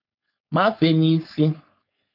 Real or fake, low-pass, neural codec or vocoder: fake; 5.4 kHz; codec, 16 kHz, 4.8 kbps, FACodec